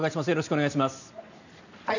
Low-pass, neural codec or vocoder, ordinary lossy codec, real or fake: 7.2 kHz; none; none; real